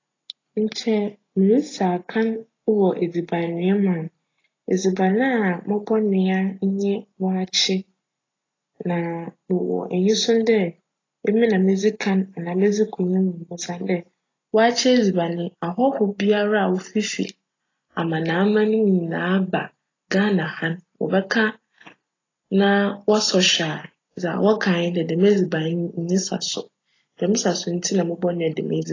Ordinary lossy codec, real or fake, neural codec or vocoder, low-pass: AAC, 32 kbps; real; none; 7.2 kHz